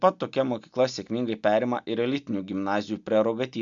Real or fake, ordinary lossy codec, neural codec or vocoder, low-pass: real; MP3, 64 kbps; none; 7.2 kHz